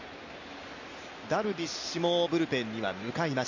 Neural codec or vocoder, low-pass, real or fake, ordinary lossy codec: none; 7.2 kHz; real; none